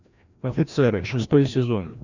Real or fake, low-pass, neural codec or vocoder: fake; 7.2 kHz; codec, 16 kHz, 1 kbps, FreqCodec, larger model